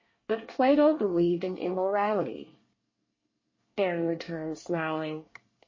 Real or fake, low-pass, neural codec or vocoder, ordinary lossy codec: fake; 7.2 kHz; codec, 24 kHz, 1 kbps, SNAC; MP3, 32 kbps